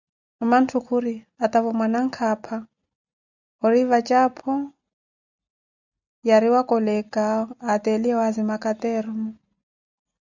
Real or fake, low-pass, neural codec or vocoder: real; 7.2 kHz; none